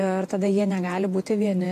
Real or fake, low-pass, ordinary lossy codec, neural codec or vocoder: fake; 14.4 kHz; AAC, 48 kbps; vocoder, 48 kHz, 128 mel bands, Vocos